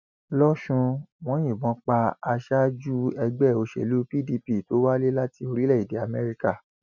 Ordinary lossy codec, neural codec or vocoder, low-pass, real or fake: none; none; 7.2 kHz; real